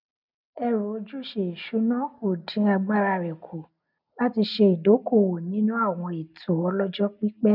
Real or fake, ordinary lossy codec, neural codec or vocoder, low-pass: real; none; none; 5.4 kHz